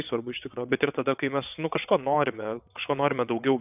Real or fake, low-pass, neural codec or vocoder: fake; 3.6 kHz; vocoder, 22.05 kHz, 80 mel bands, WaveNeXt